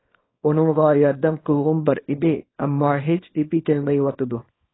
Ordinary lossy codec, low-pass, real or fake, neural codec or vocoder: AAC, 16 kbps; 7.2 kHz; fake; codec, 24 kHz, 0.9 kbps, WavTokenizer, small release